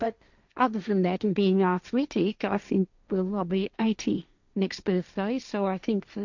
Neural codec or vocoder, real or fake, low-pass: codec, 16 kHz, 1.1 kbps, Voila-Tokenizer; fake; 7.2 kHz